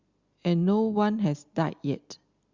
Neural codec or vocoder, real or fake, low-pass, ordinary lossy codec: none; real; 7.2 kHz; Opus, 64 kbps